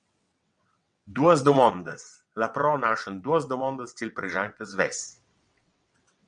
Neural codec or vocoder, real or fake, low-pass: vocoder, 22.05 kHz, 80 mel bands, WaveNeXt; fake; 9.9 kHz